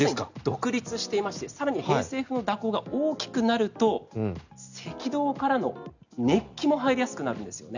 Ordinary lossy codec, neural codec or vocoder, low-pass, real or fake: none; none; 7.2 kHz; real